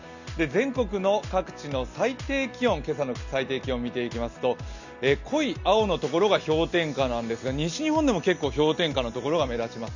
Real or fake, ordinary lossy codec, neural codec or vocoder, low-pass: real; none; none; 7.2 kHz